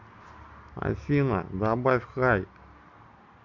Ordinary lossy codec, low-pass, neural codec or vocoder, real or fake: none; 7.2 kHz; none; real